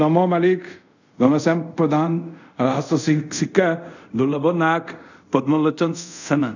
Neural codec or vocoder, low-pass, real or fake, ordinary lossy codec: codec, 24 kHz, 0.5 kbps, DualCodec; 7.2 kHz; fake; none